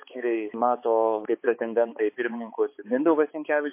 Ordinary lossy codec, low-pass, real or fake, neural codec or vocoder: MP3, 24 kbps; 3.6 kHz; fake; codec, 16 kHz, 4 kbps, X-Codec, HuBERT features, trained on balanced general audio